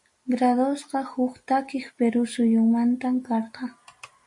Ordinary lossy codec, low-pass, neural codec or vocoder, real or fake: MP3, 48 kbps; 10.8 kHz; none; real